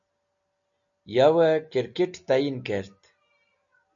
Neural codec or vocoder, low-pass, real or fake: none; 7.2 kHz; real